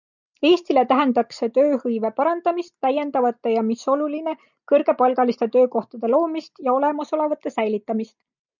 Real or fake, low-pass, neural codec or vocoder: real; 7.2 kHz; none